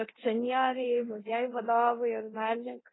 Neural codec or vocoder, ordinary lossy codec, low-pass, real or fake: codec, 24 kHz, 0.9 kbps, DualCodec; AAC, 16 kbps; 7.2 kHz; fake